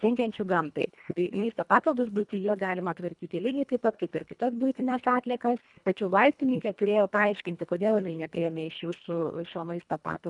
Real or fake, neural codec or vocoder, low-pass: fake; codec, 24 kHz, 1.5 kbps, HILCodec; 10.8 kHz